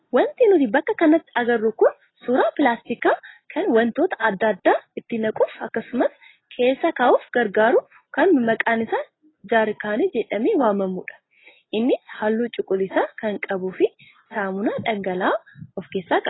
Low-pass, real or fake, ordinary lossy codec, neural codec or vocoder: 7.2 kHz; real; AAC, 16 kbps; none